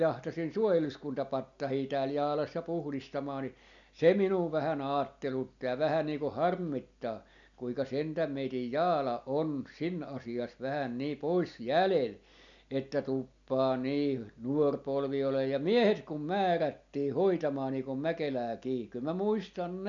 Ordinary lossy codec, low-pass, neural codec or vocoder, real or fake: none; 7.2 kHz; none; real